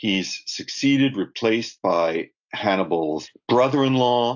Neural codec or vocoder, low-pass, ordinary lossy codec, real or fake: none; 7.2 kHz; AAC, 48 kbps; real